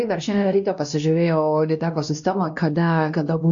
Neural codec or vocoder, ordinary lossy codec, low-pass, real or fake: codec, 16 kHz, 1 kbps, X-Codec, WavLM features, trained on Multilingual LibriSpeech; AAC, 64 kbps; 7.2 kHz; fake